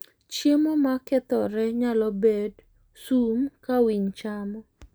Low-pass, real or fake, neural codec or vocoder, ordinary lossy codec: none; real; none; none